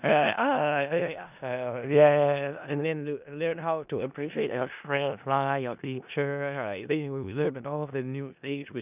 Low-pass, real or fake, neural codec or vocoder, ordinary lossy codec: 3.6 kHz; fake; codec, 16 kHz in and 24 kHz out, 0.4 kbps, LongCat-Audio-Codec, four codebook decoder; none